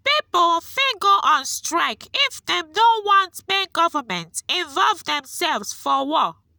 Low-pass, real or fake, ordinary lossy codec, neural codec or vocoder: none; real; none; none